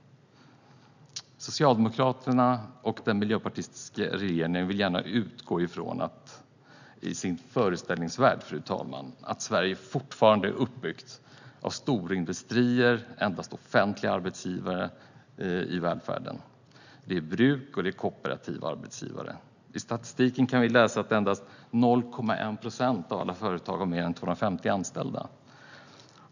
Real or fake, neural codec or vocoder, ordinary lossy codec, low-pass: real; none; none; 7.2 kHz